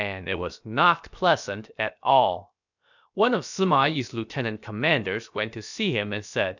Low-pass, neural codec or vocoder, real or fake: 7.2 kHz; codec, 16 kHz, about 1 kbps, DyCAST, with the encoder's durations; fake